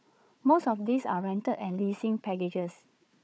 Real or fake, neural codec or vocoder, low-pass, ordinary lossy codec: fake; codec, 16 kHz, 4 kbps, FunCodec, trained on Chinese and English, 50 frames a second; none; none